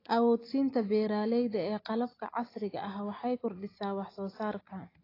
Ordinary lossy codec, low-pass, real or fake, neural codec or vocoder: AAC, 24 kbps; 5.4 kHz; real; none